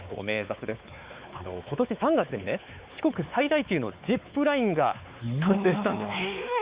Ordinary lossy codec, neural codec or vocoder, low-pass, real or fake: Opus, 64 kbps; codec, 16 kHz, 4 kbps, X-Codec, WavLM features, trained on Multilingual LibriSpeech; 3.6 kHz; fake